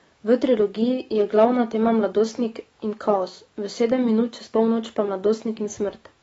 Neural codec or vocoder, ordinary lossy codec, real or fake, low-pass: autoencoder, 48 kHz, 128 numbers a frame, DAC-VAE, trained on Japanese speech; AAC, 24 kbps; fake; 19.8 kHz